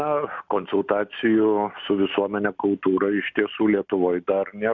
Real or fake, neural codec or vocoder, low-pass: real; none; 7.2 kHz